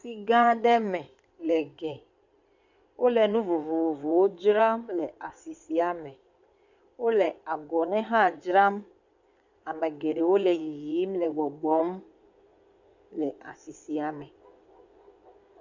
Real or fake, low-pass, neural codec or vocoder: fake; 7.2 kHz; codec, 16 kHz in and 24 kHz out, 2.2 kbps, FireRedTTS-2 codec